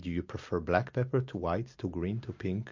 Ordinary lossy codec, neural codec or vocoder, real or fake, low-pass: MP3, 48 kbps; none; real; 7.2 kHz